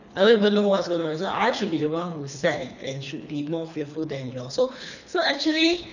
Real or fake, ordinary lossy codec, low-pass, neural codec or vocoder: fake; none; 7.2 kHz; codec, 24 kHz, 3 kbps, HILCodec